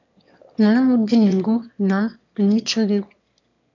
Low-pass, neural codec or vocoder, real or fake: 7.2 kHz; autoencoder, 22.05 kHz, a latent of 192 numbers a frame, VITS, trained on one speaker; fake